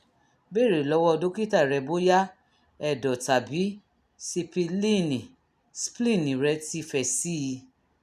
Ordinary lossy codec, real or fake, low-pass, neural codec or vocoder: none; real; 14.4 kHz; none